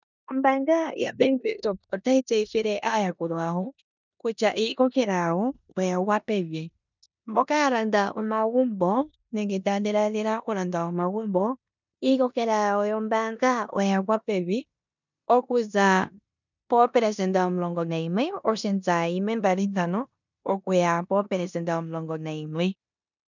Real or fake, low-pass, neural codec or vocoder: fake; 7.2 kHz; codec, 16 kHz in and 24 kHz out, 0.9 kbps, LongCat-Audio-Codec, four codebook decoder